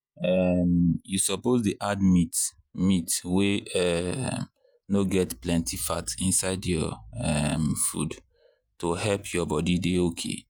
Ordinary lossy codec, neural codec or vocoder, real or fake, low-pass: none; vocoder, 48 kHz, 128 mel bands, Vocos; fake; none